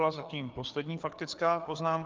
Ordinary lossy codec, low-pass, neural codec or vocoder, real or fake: Opus, 24 kbps; 7.2 kHz; codec, 16 kHz, 4 kbps, FreqCodec, larger model; fake